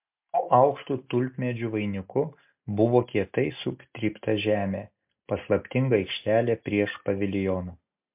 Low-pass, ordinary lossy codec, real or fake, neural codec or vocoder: 3.6 kHz; MP3, 32 kbps; real; none